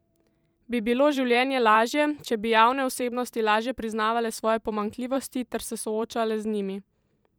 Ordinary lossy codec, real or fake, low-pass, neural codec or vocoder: none; fake; none; vocoder, 44.1 kHz, 128 mel bands every 512 samples, BigVGAN v2